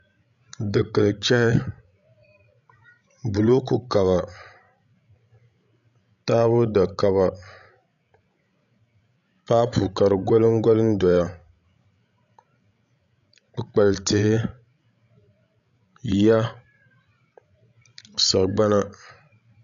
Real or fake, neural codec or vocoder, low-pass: fake; codec, 16 kHz, 16 kbps, FreqCodec, larger model; 7.2 kHz